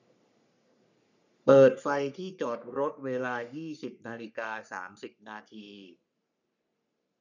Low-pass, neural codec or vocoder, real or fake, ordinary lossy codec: 7.2 kHz; codec, 44.1 kHz, 3.4 kbps, Pupu-Codec; fake; none